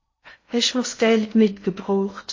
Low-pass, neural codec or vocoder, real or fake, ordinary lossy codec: 7.2 kHz; codec, 16 kHz in and 24 kHz out, 0.8 kbps, FocalCodec, streaming, 65536 codes; fake; MP3, 32 kbps